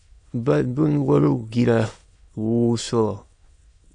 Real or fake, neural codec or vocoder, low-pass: fake; autoencoder, 22.05 kHz, a latent of 192 numbers a frame, VITS, trained on many speakers; 9.9 kHz